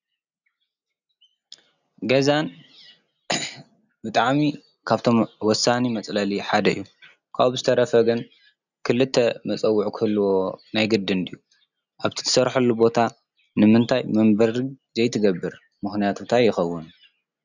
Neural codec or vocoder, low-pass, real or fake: none; 7.2 kHz; real